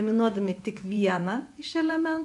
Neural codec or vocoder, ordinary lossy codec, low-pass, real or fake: vocoder, 24 kHz, 100 mel bands, Vocos; AAC, 64 kbps; 10.8 kHz; fake